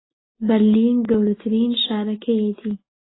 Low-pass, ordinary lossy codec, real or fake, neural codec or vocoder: 7.2 kHz; AAC, 16 kbps; real; none